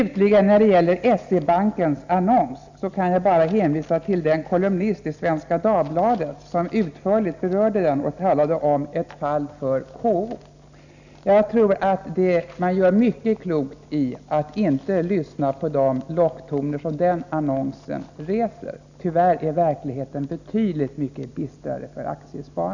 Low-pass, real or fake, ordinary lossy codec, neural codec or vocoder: 7.2 kHz; real; none; none